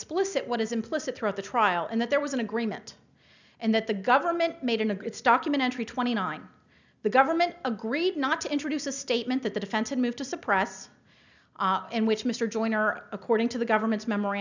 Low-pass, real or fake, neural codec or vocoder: 7.2 kHz; real; none